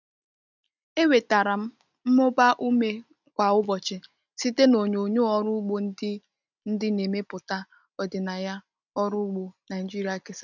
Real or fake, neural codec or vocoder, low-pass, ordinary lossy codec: real; none; 7.2 kHz; none